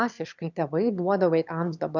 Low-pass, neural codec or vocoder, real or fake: 7.2 kHz; autoencoder, 22.05 kHz, a latent of 192 numbers a frame, VITS, trained on one speaker; fake